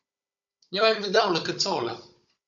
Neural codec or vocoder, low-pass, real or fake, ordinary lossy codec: codec, 16 kHz, 16 kbps, FunCodec, trained on Chinese and English, 50 frames a second; 7.2 kHz; fake; MP3, 64 kbps